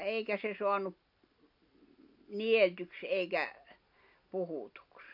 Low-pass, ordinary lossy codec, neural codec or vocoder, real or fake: 5.4 kHz; AAC, 48 kbps; none; real